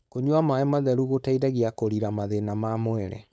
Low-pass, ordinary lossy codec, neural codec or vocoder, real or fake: none; none; codec, 16 kHz, 4.8 kbps, FACodec; fake